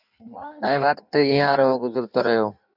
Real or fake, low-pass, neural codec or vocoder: fake; 5.4 kHz; codec, 16 kHz in and 24 kHz out, 1.1 kbps, FireRedTTS-2 codec